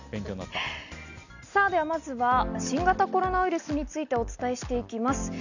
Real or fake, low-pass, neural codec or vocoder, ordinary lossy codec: real; 7.2 kHz; none; none